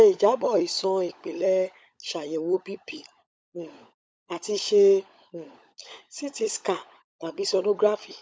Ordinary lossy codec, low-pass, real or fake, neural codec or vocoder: none; none; fake; codec, 16 kHz, 16 kbps, FunCodec, trained on LibriTTS, 50 frames a second